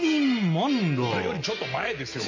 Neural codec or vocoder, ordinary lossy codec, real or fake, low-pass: none; MP3, 48 kbps; real; 7.2 kHz